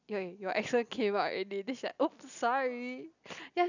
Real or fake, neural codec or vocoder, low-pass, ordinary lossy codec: real; none; 7.2 kHz; none